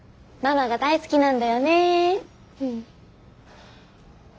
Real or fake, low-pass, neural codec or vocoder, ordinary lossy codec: real; none; none; none